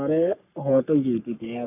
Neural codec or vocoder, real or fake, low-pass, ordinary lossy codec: codec, 44.1 kHz, 3.4 kbps, Pupu-Codec; fake; 3.6 kHz; none